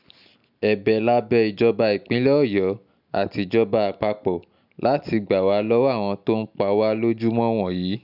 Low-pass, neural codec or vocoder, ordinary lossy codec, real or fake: 5.4 kHz; none; none; real